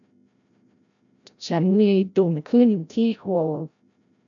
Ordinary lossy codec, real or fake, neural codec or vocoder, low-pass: none; fake; codec, 16 kHz, 0.5 kbps, FreqCodec, larger model; 7.2 kHz